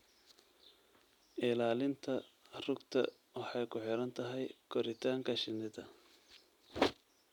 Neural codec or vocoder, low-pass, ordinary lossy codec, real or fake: none; 19.8 kHz; none; real